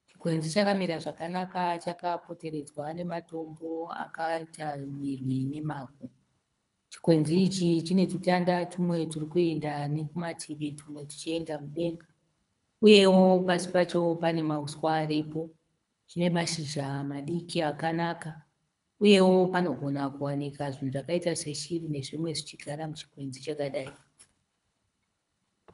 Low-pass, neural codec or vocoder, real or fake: 10.8 kHz; codec, 24 kHz, 3 kbps, HILCodec; fake